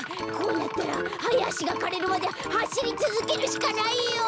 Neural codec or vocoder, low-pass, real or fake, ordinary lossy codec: none; none; real; none